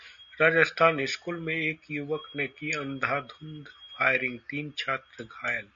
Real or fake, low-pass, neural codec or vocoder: real; 7.2 kHz; none